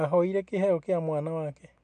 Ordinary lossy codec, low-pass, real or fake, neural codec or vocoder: MP3, 64 kbps; 9.9 kHz; real; none